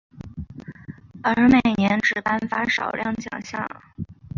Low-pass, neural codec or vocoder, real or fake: 7.2 kHz; none; real